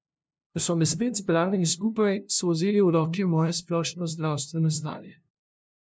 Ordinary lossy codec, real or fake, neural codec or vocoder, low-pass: none; fake; codec, 16 kHz, 0.5 kbps, FunCodec, trained on LibriTTS, 25 frames a second; none